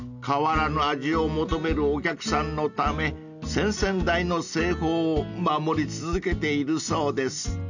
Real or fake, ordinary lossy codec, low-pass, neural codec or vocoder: real; none; 7.2 kHz; none